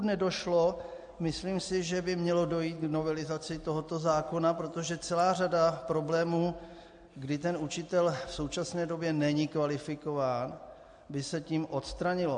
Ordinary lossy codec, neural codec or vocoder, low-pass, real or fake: AAC, 64 kbps; none; 9.9 kHz; real